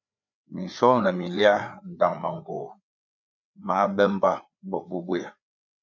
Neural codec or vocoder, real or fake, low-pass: codec, 16 kHz, 4 kbps, FreqCodec, larger model; fake; 7.2 kHz